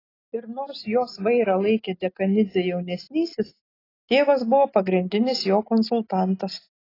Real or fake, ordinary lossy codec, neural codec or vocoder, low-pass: real; AAC, 24 kbps; none; 5.4 kHz